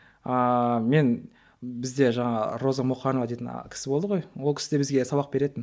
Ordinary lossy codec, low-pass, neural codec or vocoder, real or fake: none; none; none; real